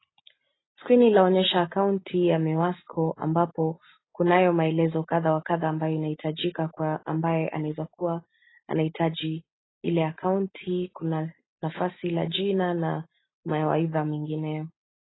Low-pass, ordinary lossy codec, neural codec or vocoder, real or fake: 7.2 kHz; AAC, 16 kbps; none; real